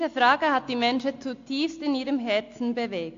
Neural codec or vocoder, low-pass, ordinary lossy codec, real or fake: none; 7.2 kHz; AAC, 48 kbps; real